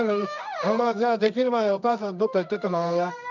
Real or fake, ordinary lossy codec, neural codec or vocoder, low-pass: fake; none; codec, 24 kHz, 0.9 kbps, WavTokenizer, medium music audio release; 7.2 kHz